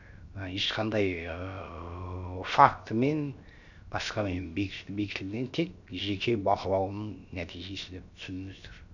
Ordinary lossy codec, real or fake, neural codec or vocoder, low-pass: none; fake; codec, 16 kHz, 0.7 kbps, FocalCodec; 7.2 kHz